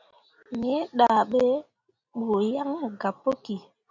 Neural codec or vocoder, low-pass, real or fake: none; 7.2 kHz; real